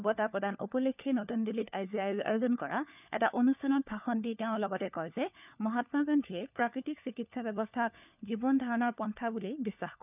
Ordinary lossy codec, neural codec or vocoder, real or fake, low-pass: none; codec, 16 kHz, 4 kbps, FunCodec, trained on LibriTTS, 50 frames a second; fake; 3.6 kHz